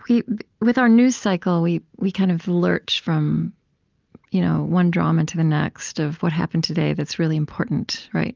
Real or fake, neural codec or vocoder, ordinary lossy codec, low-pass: real; none; Opus, 24 kbps; 7.2 kHz